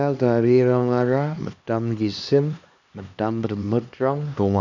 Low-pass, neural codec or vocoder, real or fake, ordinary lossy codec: 7.2 kHz; codec, 16 kHz, 2 kbps, X-Codec, HuBERT features, trained on LibriSpeech; fake; none